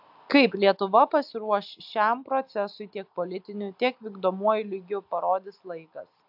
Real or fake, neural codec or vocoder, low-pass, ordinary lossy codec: real; none; 5.4 kHz; AAC, 48 kbps